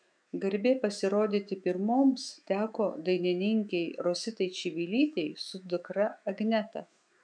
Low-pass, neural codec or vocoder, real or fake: 9.9 kHz; autoencoder, 48 kHz, 128 numbers a frame, DAC-VAE, trained on Japanese speech; fake